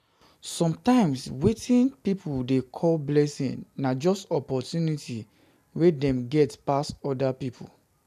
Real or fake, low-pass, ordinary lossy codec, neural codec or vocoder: real; 14.4 kHz; none; none